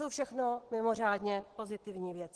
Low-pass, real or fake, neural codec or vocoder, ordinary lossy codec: 10.8 kHz; real; none; Opus, 16 kbps